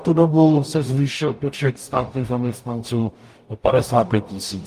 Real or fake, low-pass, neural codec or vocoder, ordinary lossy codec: fake; 14.4 kHz; codec, 44.1 kHz, 0.9 kbps, DAC; Opus, 32 kbps